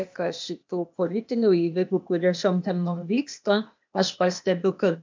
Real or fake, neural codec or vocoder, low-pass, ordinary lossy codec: fake; codec, 16 kHz, 0.8 kbps, ZipCodec; 7.2 kHz; MP3, 64 kbps